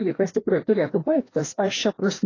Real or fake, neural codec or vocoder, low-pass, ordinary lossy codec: fake; codec, 16 kHz, 1 kbps, FreqCodec, larger model; 7.2 kHz; AAC, 32 kbps